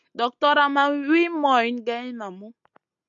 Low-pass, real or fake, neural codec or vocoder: 7.2 kHz; real; none